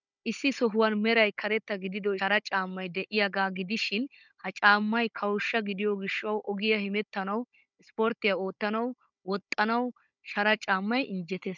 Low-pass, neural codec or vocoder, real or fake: 7.2 kHz; codec, 16 kHz, 16 kbps, FunCodec, trained on Chinese and English, 50 frames a second; fake